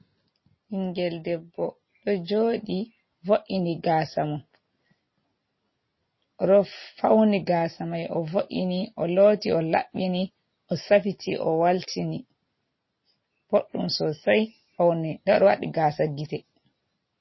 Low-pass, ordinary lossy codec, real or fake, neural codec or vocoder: 7.2 kHz; MP3, 24 kbps; real; none